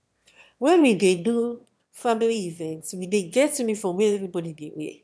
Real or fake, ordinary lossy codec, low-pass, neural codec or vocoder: fake; none; none; autoencoder, 22.05 kHz, a latent of 192 numbers a frame, VITS, trained on one speaker